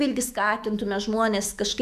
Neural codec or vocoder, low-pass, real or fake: autoencoder, 48 kHz, 128 numbers a frame, DAC-VAE, trained on Japanese speech; 14.4 kHz; fake